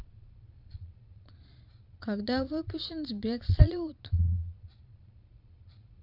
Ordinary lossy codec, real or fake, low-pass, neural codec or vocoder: MP3, 48 kbps; fake; 5.4 kHz; vocoder, 22.05 kHz, 80 mel bands, Vocos